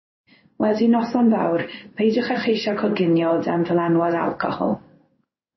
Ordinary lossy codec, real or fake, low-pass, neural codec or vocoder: MP3, 24 kbps; fake; 7.2 kHz; codec, 16 kHz in and 24 kHz out, 1 kbps, XY-Tokenizer